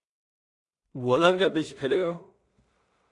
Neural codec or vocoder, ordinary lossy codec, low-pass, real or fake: codec, 16 kHz in and 24 kHz out, 0.4 kbps, LongCat-Audio-Codec, two codebook decoder; AAC, 48 kbps; 10.8 kHz; fake